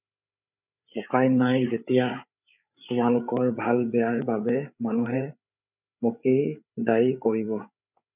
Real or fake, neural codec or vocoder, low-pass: fake; codec, 16 kHz, 8 kbps, FreqCodec, larger model; 3.6 kHz